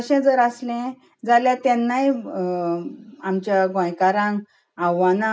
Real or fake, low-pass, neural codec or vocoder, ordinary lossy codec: real; none; none; none